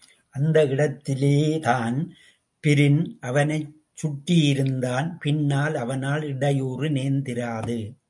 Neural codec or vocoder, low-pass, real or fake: none; 10.8 kHz; real